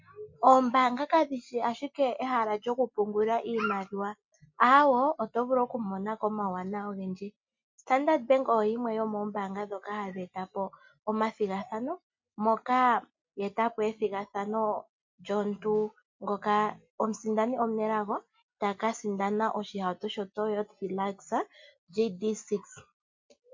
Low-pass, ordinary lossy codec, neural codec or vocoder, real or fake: 7.2 kHz; MP3, 48 kbps; none; real